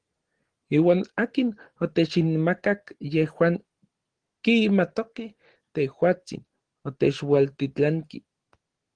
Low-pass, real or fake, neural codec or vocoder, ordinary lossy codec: 9.9 kHz; real; none; Opus, 16 kbps